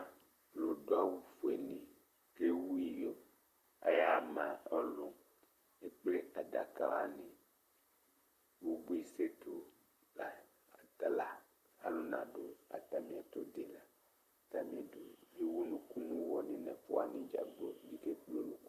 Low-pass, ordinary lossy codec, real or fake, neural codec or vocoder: 14.4 kHz; Opus, 64 kbps; fake; vocoder, 44.1 kHz, 128 mel bands, Pupu-Vocoder